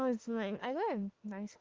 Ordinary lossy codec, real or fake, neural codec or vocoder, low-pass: Opus, 16 kbps; fake; autoencoder, 48 kHz, 32 numbers a frame, DAC-VAE, trained on Japanese speech; 7.2 kHz